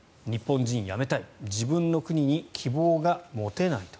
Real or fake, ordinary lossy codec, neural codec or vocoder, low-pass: real; none; none; none